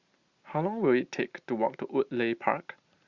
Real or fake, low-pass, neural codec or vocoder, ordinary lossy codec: real; 7.2 kHz; none; Opus, 64 kbps